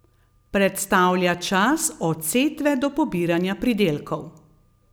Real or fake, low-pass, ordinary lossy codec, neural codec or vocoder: real; none; none; none